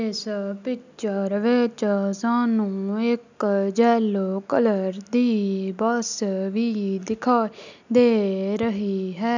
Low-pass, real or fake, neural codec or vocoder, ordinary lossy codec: 7.2 kHz; real; none; none